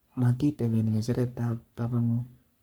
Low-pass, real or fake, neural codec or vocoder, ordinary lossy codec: none; fake; codec, 44.1 kHz, 3.4 kbps, Pupu-Codec; none